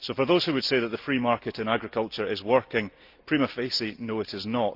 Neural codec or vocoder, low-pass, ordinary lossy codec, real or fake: none; 5.4 kHz; Opus, 24 kbps; real